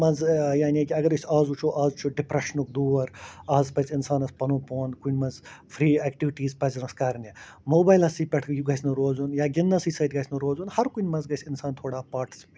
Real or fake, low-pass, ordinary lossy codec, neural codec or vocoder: real; none; none; none